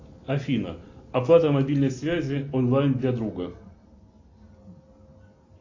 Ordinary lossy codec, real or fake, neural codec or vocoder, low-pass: AAC, 48 kbps; real; none; 7.2 kHz